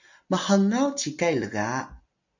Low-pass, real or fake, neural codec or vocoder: 7.2 kHz; real; none